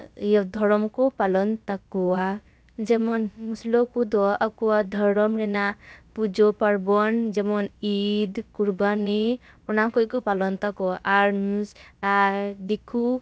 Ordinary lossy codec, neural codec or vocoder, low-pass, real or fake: none; codec, 16 kHz, about 1 kbps, DyCAST, with the encoder's durations; none; fake